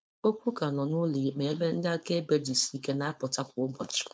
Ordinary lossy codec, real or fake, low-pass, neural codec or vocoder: none; fake; none; codec, 16 kHz, 4.8 kbps, FACodec